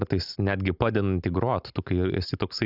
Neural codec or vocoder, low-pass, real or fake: none; 5.4 kHz; real